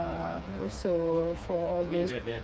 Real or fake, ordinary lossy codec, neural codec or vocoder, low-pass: fake; none; codec, 16 kHz, 4 kbps, FreqCodec, smaller model; none